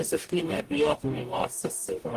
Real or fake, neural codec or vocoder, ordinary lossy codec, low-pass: fake; codec, 44.1 kHz, 0.9 kbps, DAC; Opus, 24 kbps; 14.4 kHz